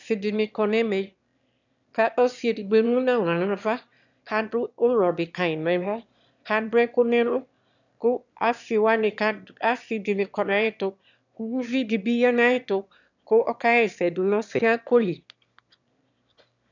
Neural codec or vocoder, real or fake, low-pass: autoencoder, 22.05 kHz, a latent of 192 numbers a frame, VITS, trained on one speaker; fake; 7.2 kHz